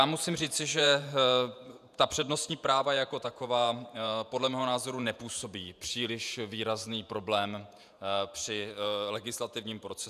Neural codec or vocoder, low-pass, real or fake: vocoder, 48 kHz, 128 mel bands, Vocos; 14.4 kHz; fake